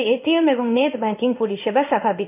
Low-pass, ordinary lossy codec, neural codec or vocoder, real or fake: 3.6 kHz; none; codec, 16 kHz in and 24 kHz out, 1 kbps, XY-Tokenizer; fake